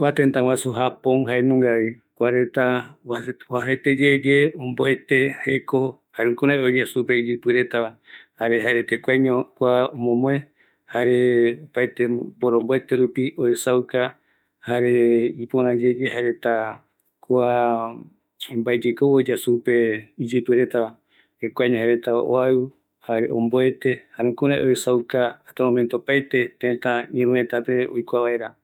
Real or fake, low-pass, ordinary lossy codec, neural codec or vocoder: fake; 19.8 kHz; none; autoencoder, 48 kHz, 32 numbers a frame, DAC-VAE, trained on Japanese speech